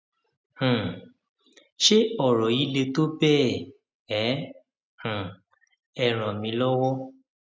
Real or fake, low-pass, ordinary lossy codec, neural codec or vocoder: real; none; none; none